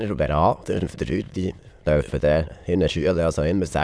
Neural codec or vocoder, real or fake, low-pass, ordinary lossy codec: autoencoder, 22.05 kHz, a latent of 192 numbers a frame, VITS, trained on many speakers; fake; none; none